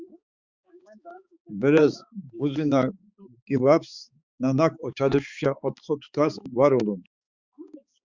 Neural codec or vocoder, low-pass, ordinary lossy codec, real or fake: codec, 16 kHz, 4 kbps, X-Codec, HuBERT features, trained on balanced general audio; 7.2 kHz; Opus, 64 kbps; fake